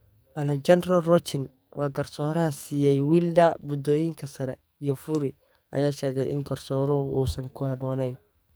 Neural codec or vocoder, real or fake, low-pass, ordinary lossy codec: codec, 44.1 kHz, 2.6 kbps, SNAC; fake; none; none